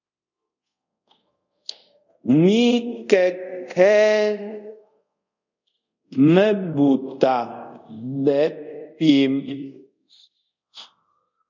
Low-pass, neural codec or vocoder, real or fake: 7.2 kHz; codec, 24 kHz, 0.5 kbps, DualCodec; fake